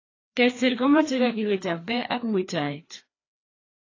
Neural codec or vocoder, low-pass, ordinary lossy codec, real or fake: codec, 16 kHz, 2 kbps, FreqCodec, larger model; 7.2 kHz; AAC, 32 kbps; fake